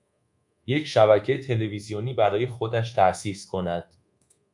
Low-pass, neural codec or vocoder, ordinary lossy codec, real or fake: 10.8 kHz; codec, 24 kHz, 1.2 kbps, DualCodec; MP3, 96 kbps; fake